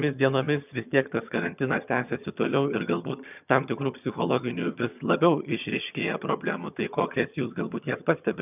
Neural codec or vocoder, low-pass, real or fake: vocoder, 22.05 kHz, 80 mel bands, HiFi-GAN; 3.6 kHz; fake